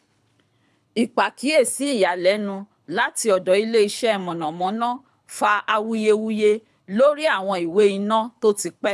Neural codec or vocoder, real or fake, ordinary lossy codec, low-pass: codec, 24 kHz, 6 kbps, HILCodec; fake; none; none